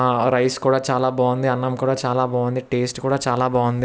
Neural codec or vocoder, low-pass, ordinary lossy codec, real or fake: none; none; none; real